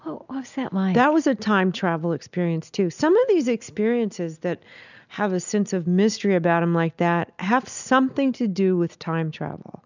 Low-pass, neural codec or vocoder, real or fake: 7.2 kHz; none; real